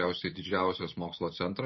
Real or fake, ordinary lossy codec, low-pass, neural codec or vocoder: real; MP3, 24 kbps; 7.2 kHz; none